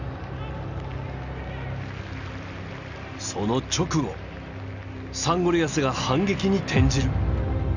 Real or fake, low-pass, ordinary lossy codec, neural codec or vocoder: real; 7.2 kHz; none; none